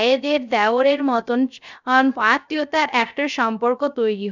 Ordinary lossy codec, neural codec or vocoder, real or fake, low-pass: none; codec, 16 kHz, 0.3 kbps, FocalCodec; fake; 7.2 kHz